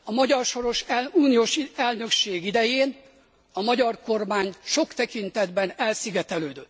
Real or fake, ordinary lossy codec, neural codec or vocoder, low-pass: real; none; none; none